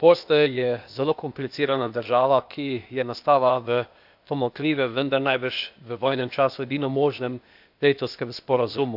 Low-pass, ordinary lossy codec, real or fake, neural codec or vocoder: 5.4 kHz; none; fake; codec, 16 kHz, 0.8 kbps, ZipCodec